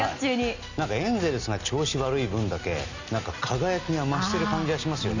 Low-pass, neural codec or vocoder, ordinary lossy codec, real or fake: 7.2 kHz; none; none; real